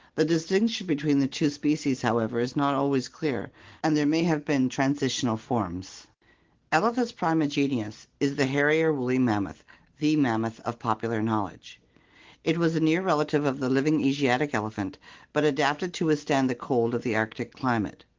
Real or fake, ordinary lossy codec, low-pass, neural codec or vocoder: real; Opus, 16 kbps; 7.2 kHz; none